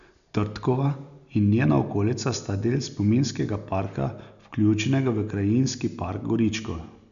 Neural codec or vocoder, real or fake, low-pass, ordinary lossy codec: none; real; 7.2 kHz; none